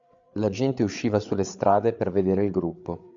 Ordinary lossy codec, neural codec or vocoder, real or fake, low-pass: AAC, 64 kbps; codec, 16 kHz, 8 kbps, FreqCodec, larger model; fake; 7.2 kHz